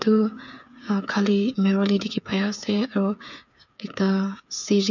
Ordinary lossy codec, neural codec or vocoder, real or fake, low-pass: none; codec, 16 kHz, 8 kbps, FreqCodec, smaller model; fake; 7.2 kHz